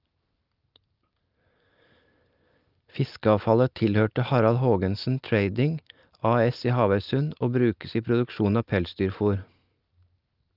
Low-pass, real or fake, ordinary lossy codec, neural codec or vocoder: 5.4 kHz; real; Opus, 24 kbps; none